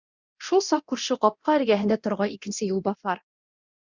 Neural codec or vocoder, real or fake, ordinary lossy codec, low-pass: codec, 24 kHz, 0.9 kbps, DualCodec; fake; Opus, 64 kbps; 7.2 kHz